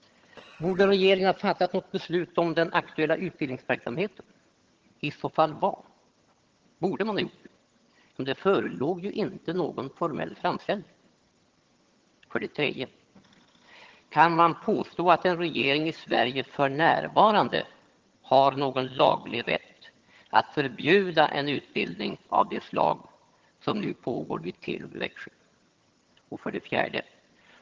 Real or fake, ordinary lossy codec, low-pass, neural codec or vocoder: fake; Opus, 16 kbps; 7.2 kHz; vocoder, 22.05 kHz, 80 mel bands, HiFi-GAN